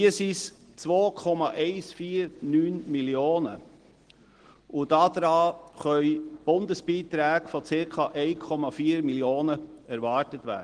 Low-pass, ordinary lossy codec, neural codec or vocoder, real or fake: 9.9 kHz; Opus, 16 kbps; none; real